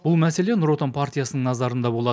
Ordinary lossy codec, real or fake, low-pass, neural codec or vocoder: none; real; none; none